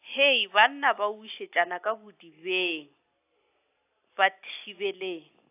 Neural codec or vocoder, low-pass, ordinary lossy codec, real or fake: none; 3.6 kHz; AAC, 24 kbps; real